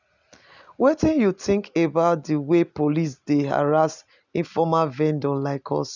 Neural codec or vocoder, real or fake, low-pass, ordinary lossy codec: none; real; 7.2 kHz; none